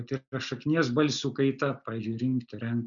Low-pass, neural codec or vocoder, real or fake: 7.2 kHz; none; real